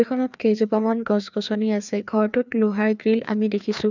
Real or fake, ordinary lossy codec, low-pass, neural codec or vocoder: fake; none; 7.2 kHz; codec, 16 kHz, 2 kbps, FreqCodec, larger model